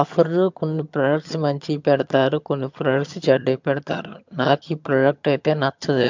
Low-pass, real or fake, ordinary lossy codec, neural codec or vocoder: 7.2 kHz; fake; MP3, 64 kbps; vocoder, 22.05 kHz, 80 mel bands, HiFi-GAN